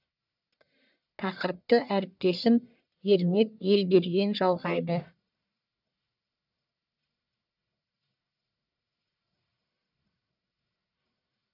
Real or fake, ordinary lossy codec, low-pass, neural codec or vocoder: fake; none; 5.4 kHz; codec, 44.1 kHz, 1.7 kbps, Pupu-Codec